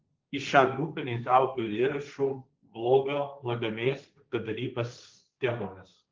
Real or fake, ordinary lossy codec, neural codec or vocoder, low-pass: fake; Opus, 32 kbps; codec, 16 kHz, 1.1 kbps, Voila-Tokenizer; 7.2 kHz